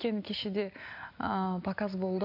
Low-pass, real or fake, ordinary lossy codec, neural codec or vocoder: 5.4 kHz; real; none; none